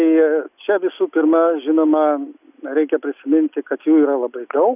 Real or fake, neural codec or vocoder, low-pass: real; none; 3.6 kHz